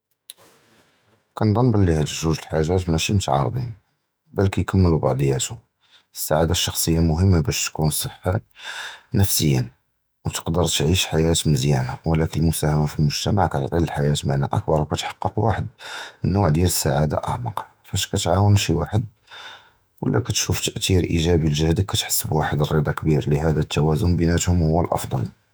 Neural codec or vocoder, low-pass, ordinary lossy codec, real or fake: autoencoder, 48 kHz, 128 numbers a frame, DAC-VAE, trained on Japanese speech; none; none; fake